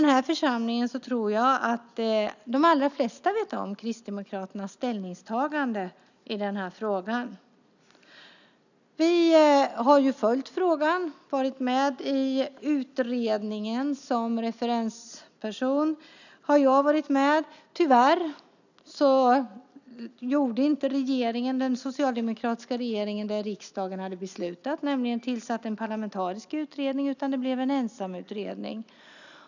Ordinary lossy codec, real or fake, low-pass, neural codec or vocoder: none; real; 7.2 kHz; none